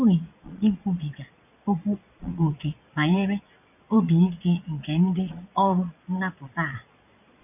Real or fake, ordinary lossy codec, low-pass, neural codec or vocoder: fake; none; 3.6 kHz; vocoder, 22.05 kHz, 80 mel bands, Vocos